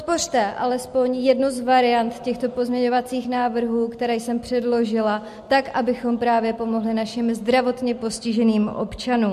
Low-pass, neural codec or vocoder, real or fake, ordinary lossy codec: 14.4 kHz; none; real; MP3, 64 kbps